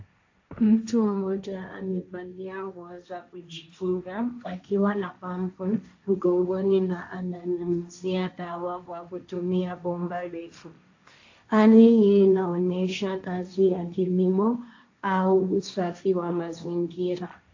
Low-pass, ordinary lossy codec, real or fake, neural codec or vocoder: 7.2 kHz; AAC, 48 kbps; fake; codec, 16 kHz, 1.1 kbps, Voila-Tokenizer